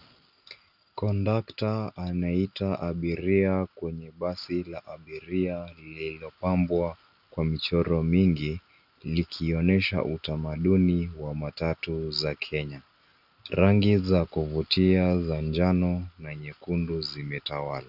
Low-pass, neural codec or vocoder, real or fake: 5.4 kHz; none; real